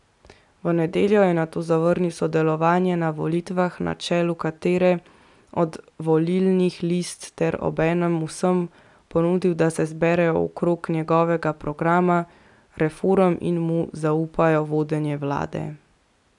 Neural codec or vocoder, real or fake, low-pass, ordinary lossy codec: none; real; 10.8 kHz; none